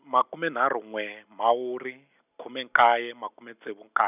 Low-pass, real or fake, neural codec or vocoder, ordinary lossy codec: 3.6 kHz; real; none; none